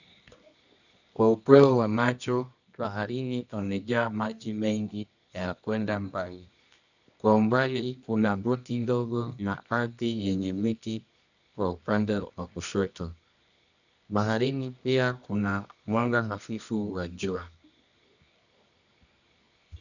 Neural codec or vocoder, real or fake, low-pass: codec, 24 kHz, 0.9 kbps, WavTokenizer, medium music audio release; fake; 7.2 kHz